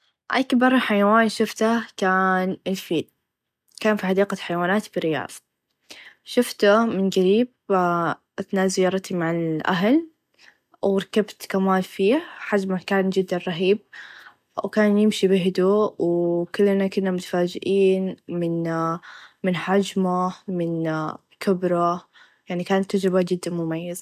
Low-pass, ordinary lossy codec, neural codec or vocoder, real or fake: 14.4 kHz; none; none; real